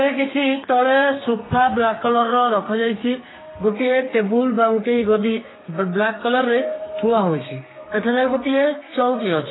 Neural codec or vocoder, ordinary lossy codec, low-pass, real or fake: codec, 32 kHz, 1.9 kbps, SNAC; AAC, 16 kbps; 7.2 kHz; fake